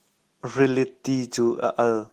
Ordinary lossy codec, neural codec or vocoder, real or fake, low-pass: Opus, 16 kbps; none; real; 14.4 kHz